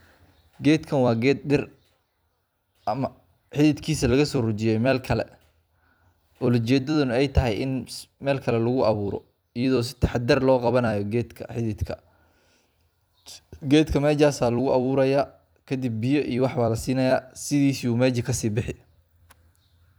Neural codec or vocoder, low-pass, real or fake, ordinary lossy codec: vocoder, 44.1 kHz, 128 mel bands every 256 samples, BigVGAN v2; none; fake; none